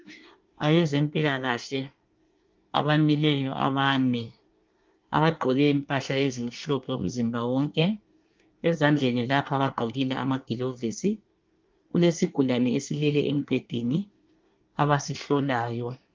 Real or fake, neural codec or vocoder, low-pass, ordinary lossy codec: fake; codec, 24 kHz, 1 kbps, SNAC; 7.2 kHz; Opus, 24 kbps